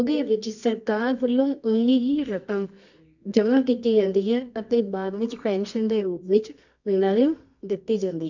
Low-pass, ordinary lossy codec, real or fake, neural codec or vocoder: 7.2 kHz; none; fake; codec, 24 kHz, 0.9 kbps, WavTokenizer, medium music audio release